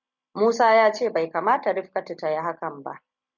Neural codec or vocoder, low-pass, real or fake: none; 7.2 kHz; real